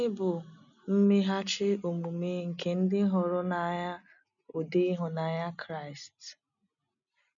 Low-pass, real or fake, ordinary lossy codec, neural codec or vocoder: 7.2 kHz; real; none; none